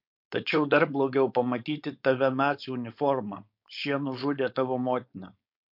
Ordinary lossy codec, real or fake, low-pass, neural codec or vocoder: MP3, 48 kbps; fake; 5.4 kHz; codec, 16 kHz, 4.8 kbps, FACodec